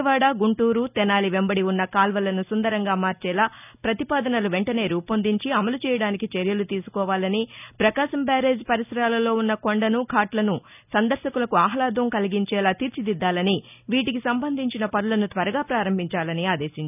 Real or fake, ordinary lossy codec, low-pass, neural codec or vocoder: real; none; 3.6 kHz; none